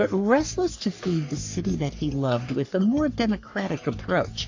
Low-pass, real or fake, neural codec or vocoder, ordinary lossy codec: 7.2 kHz; fake; codec, 44.1 kHz, 3.4 kbps, Pupu-Codec; AAC, 48 kbps